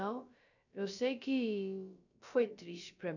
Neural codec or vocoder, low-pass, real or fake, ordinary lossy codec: codec, 16 kHz, about 1 kbps, DyCAST, with the encoder's durations; 7.2 kHz; fake; none